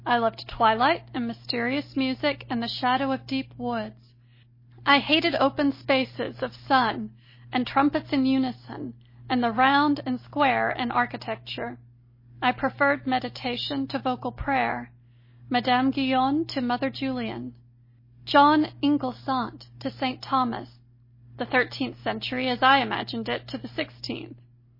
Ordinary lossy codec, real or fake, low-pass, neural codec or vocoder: MP3, 24 kbps; real; 5.4 kHz; none